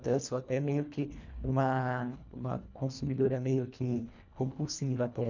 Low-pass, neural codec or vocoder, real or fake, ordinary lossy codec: 7.2 kHz; codec, 24 kHz, 1.5 kbps, HILCodec; fake; none